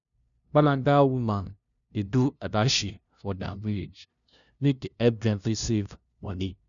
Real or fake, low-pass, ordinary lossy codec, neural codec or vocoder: fake; 7.2 kHz; none; codec, 16 kHz, 0.5 kbps, FunCodec, trained on LibriTTS, 25 frames a second